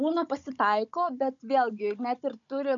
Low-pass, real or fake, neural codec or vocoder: 7.2 kHz; fake; codec, 16 kHz, 16 kbps, FunCodec, trained on Chinese and English, 50 frames a second